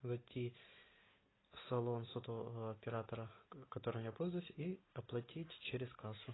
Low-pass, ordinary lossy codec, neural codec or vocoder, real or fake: 7.2 kHz; AAC, 16 kbps; none; real